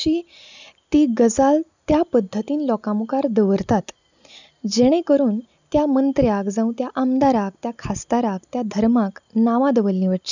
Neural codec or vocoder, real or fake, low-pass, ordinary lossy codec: none; real; 7.2 kHz; none